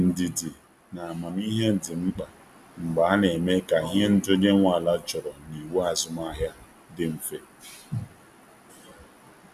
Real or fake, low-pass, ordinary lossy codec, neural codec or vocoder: real; 14.4 kHz; none; none